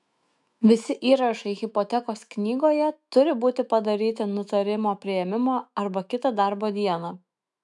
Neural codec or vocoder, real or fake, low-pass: autoencoder, 48 kHz, 128 numbers a frame, DAC-VAE, trained on Japanese speech; fake; 10.8 kHz